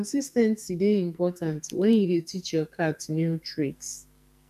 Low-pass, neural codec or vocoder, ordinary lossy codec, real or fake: 14.4 kHz; codec, 44.1 kHz, 2.6 kbps, SNAC; none; fake